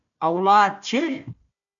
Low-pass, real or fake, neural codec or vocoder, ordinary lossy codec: 7.2 kHz; fake; codec, 16 kHz, 1 kbps, FunCodec, trained on Chinese and English, 50 frames a second; MP3, 64 kbps